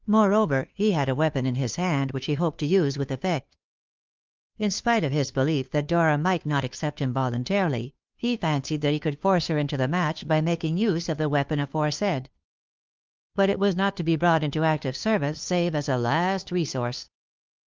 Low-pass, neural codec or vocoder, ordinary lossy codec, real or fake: 7.2 kHz; codec, 16 kHz, 2 kbps, FunCodec, trained on Chinese and English, 25 frames a second; Opus, 24 kbps; fake